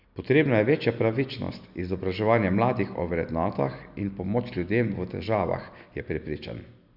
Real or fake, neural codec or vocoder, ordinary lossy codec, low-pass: real; none; none; 5.4 kHz